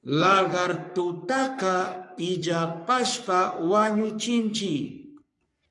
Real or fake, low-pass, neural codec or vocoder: fake; 10.8 kHz; codec, 44.1 kHz, 3.4 kbps, Pupu-Codec